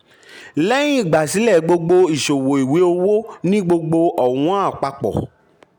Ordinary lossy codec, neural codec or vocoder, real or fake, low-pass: none; none; real; 19.8 kHz